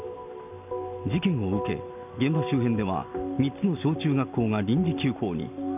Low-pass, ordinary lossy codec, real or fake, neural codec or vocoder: 3.6 kHz; none; real; none